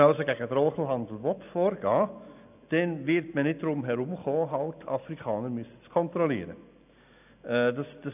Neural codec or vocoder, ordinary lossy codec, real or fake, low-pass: none; none; real; 3.6 kHz